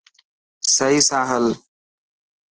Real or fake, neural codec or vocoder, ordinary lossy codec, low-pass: real; none; Opus, 16 kbps; 7.2 kHz